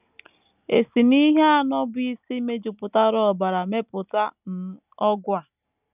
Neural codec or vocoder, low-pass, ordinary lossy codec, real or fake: none; 3.6 kHz; none; real